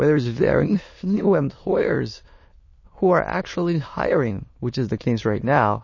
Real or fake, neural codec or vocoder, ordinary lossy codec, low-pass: fake; autoencoder, 22.05 kHz, a latent of 192 numbers a frame, VITS, trained on many speakers; MP3, 32 kbps; 7.2 kHz